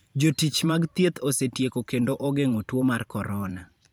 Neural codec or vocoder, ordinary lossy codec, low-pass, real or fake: vocoder, 44.1 kHz, 128 mel bands every 512 samples, BigVGAN v2; none; none; fake